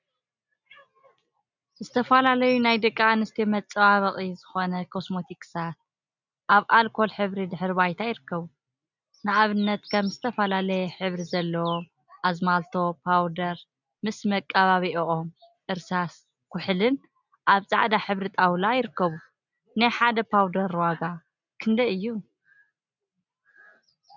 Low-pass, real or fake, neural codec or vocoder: 7.2 kHz; real; none